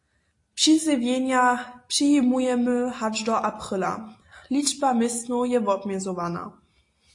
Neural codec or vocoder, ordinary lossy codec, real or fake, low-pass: none; AAC, 48 kbps; real; 10.8 kHz